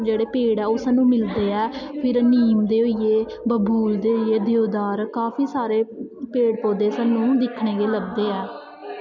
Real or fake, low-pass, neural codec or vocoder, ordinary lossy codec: real; 7.2 kHz; none; MP3, 64 kbps